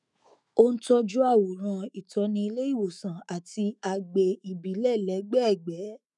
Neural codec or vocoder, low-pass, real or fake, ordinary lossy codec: autoencoder, 48 kHz, 128 numbers a frame, DAC-VAE, trained on Japanese speech; 10.8 kHz; fake; none